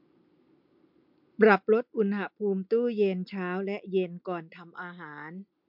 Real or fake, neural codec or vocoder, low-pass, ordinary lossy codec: real; none; 5.4 kHz; MP3, 48 kbps